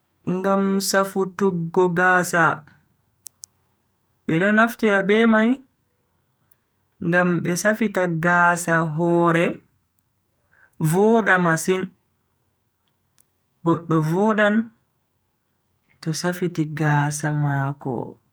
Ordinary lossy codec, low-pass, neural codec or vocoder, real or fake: none; none; codec, 44.1 kHz, 2.6 kbps, SNAC; fake